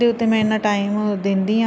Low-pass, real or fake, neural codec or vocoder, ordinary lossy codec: none; real; none; none